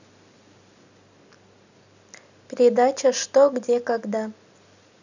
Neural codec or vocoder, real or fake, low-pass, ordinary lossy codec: none; real; 7.2 kHz; none